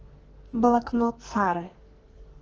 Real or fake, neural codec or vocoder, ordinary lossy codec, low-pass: fake; codec, 44.1 kHz, 2.6 kbps, SNAC; Opus, 16 kbps; 7.2 kHz